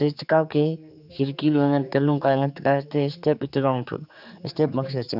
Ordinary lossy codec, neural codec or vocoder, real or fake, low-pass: none; codec, 16 kHz, 4 kbps, X-Codec, HuBERT features, trained on general audio; fake; 5.4 kHz